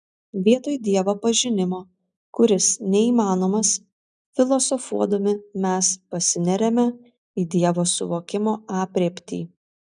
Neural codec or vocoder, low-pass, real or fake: none; 9.9 kHz; real